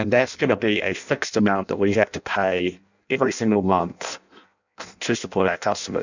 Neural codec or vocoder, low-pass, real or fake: codec, 16 kHz in and 24 kHz out, 0.6 kbps, FireRedTTS-2 codec; 7.2 kHz; fake